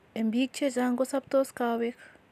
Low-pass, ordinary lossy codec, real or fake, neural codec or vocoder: 14.4 kHz; none; real; none